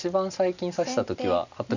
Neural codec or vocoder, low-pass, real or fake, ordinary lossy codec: none; 7.2 kHz; real; none